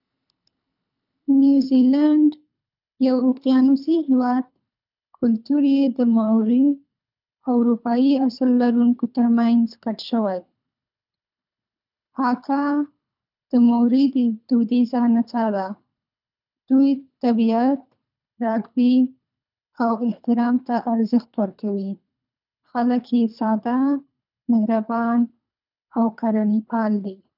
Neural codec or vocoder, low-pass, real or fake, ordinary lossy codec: codec, 24 kHz, 3 kbps, HILCodec; 5.4 kHz; fake; none